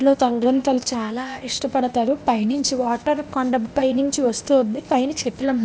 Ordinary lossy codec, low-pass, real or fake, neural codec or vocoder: none; none; fake; codec, 16 kHz, 0.8 kbps, ZipCodec